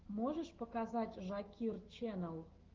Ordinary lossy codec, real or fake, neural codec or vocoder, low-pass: Opus, 16 kbps; fake; autoencoder, 48 kHz, 128 numbers a frame, DAC-VAE, trained on Japanese speech; 7.2 kHz